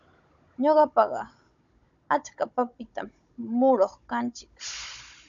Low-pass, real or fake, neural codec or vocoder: 7.2 kHz; fake; codec, 16 kHz, 16 kbps, FunCodec, trained on Chinese and English, 50 frames a second